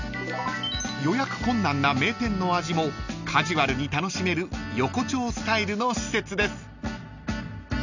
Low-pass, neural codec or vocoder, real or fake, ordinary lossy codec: 7.2 kHz; none; real; none